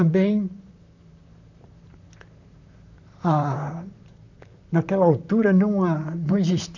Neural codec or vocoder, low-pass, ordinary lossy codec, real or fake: vocoder, 44.1 kHz, 128 mel bands, Pupu-Vocoder; 7.2 kHz; none; fake